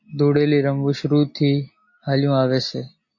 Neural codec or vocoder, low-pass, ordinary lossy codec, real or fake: none; 7.2 kHz; MP3, 32 kbps; real